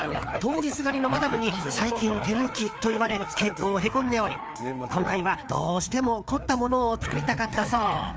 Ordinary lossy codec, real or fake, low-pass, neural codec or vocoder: none; fake; none; codec, 16 kHz, 4 kbps, FreqCodec, larger model